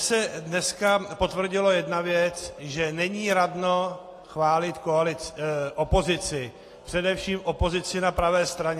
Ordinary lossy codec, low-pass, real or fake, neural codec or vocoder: AAC, 48 kbps; 14.4 kHz; real; none